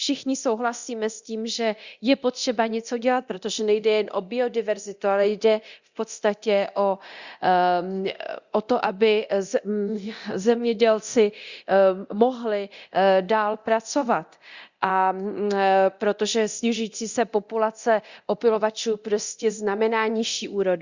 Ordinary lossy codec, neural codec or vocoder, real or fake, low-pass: Opus, 64 kbps; codec, 24 kHz, 0.9 kbps, DualCodec; fake; 7.2 kHz